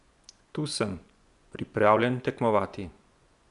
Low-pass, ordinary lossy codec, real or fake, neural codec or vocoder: 10.8 kHz; none; fake; vocoder, 24 kHz, 100 mel bands, Vocos